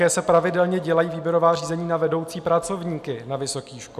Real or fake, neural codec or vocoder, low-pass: real; none; 14.4 kHz